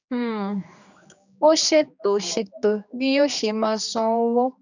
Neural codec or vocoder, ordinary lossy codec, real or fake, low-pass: codec, 16 kHz, 2 kbps, X-Codec, HuBERT features, trained on general audio; none; fake; 7.2 kHz